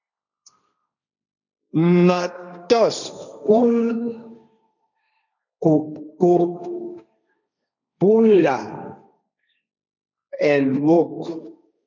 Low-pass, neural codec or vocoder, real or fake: 7.2 kHz; codec, 16 kHz, 1.1 kbps, Voila-Tokenizer; fake